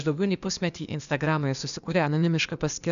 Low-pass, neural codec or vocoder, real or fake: 7.2 kHz; codec, 16 kHz, 0.8 kbps, ZipCodec; fake